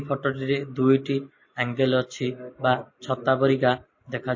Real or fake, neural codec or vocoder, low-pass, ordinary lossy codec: real; none; 7.2 kHz; MP3, 32 kbps